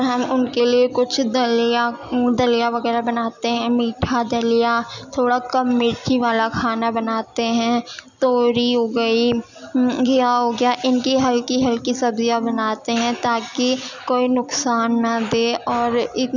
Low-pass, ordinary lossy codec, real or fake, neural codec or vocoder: 7.2 kHz; none; real; none